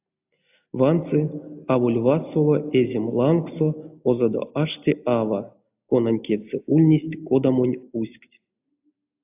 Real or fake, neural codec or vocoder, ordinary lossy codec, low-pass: real; none; AAC, 32 kbps; 3.6 kHz